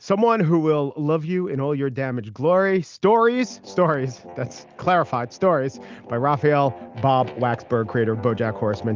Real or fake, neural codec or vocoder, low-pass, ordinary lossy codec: real; none; 7.2 kHz; Opus, 24 kbps